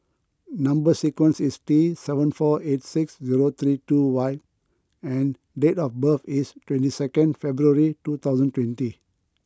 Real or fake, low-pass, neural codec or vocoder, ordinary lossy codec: real; none; none; none